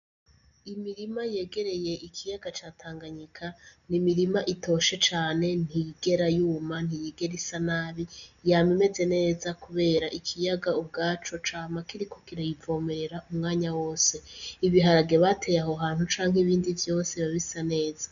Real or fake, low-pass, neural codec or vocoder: real; 7.2 kHz; none